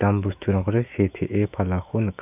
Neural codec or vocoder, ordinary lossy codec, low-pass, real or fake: autoencoder, 48 kHz, 128 numbers a frame, DAC-VAE, trained on Japanese speech; none; 3.6 kHz; fake